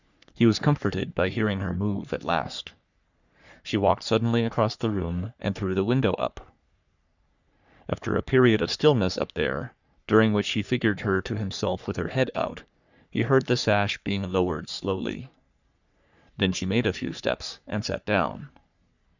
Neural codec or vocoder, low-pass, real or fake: codec, 44.1 kHz, 3.4 kbps, Pupu-Codec; 7.2 kHz; fake